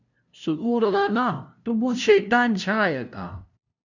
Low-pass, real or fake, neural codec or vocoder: 7.2 kHz; fake; codec, 16 kHz, 0.5 kbps, FunCodec, trained on LibriTTS, 25 frames a second